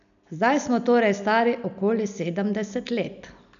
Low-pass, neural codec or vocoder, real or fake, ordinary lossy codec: 7.2 kHz; none; real; none